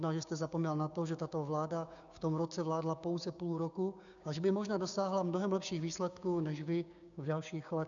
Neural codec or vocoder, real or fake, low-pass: codec, 16 kHz, 6 kbps, DAC; fake; 7.2 kHz